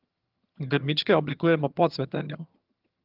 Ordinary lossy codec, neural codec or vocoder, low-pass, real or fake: Opus, 32 kbps; vocoder, 22.05 kHz, 80 mel bands, HiFi-GAN; 5.4 kHz; fake